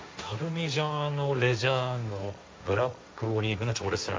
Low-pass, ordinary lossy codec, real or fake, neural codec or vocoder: none; none; fake; codec, 16 kHz, 1.1 kbps, Voila-Tokenizer